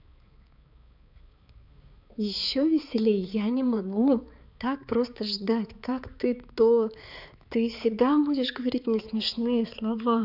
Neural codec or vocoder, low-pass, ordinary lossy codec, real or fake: codec, 16 kHz, 4 kbps, X-Codec, HuBERT features, trained on balanced general audio; 5.4 kHz; none; fake